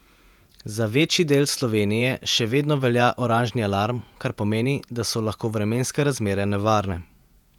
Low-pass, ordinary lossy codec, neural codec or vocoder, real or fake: 19.8 kHz; none; vocoder, 48 kHz, 128 mel bands, Vocos; fake